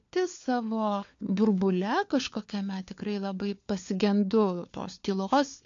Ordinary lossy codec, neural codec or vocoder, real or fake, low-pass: AAC, 48 kbps; codec, 16 kHz, 4 kbps, FunCodec, trained on LibriTTS, 50 frames a second; fake; 7.2 kHz